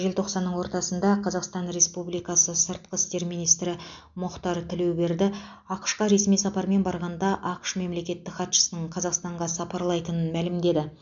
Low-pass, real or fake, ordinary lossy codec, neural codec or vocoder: 7.2 kHz; real; MP3, 64 kbps; none